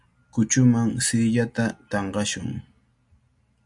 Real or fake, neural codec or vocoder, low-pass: real; none; 10.8 kHz